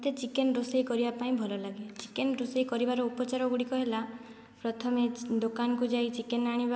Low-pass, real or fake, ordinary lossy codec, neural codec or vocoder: none; real; none; none